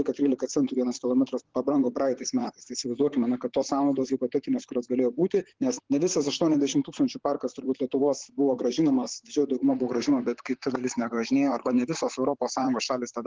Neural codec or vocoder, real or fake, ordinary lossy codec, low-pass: vocoder, 44.1 kHz, 128 mel bands, Pupu-Vocoder; fake; Opus, 16 kbps; 7.2 kHz